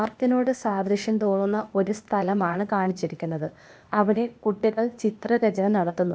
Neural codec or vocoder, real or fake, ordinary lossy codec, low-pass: codec, 16 kHz, 0.8 kbps, ZipCodec; fake; none; none